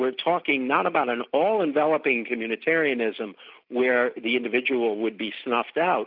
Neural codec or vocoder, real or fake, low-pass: none; real; 5.4 kHz